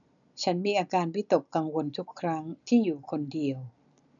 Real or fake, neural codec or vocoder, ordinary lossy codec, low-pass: real; none; none; 7.2 kHz